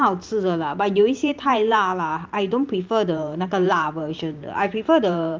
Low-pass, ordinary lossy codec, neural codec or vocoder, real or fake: 7.2 kHz; Opus, 24 kbps; vocoder, 44.1 kHz, 128 mel bands every 512 samples, BigVGAN v2; fake